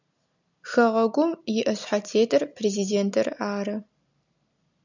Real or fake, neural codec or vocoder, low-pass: real; none; 7.2 kHz